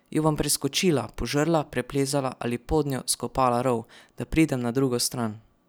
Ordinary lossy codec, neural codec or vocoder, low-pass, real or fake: none; none; none; real